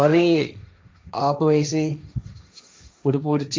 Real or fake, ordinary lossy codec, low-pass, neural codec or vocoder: fake; none; none; codec, 16 kHz, 1.1 kbps, Voila-Tokenizer